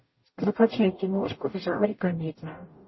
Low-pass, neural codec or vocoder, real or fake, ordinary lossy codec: 7.2 kHz; codec, 44.1 kHz, 0.9 kbps, DAC; fake; MP3, 24 kbps